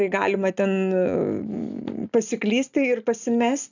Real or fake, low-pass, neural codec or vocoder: real; 7.2 kHz; none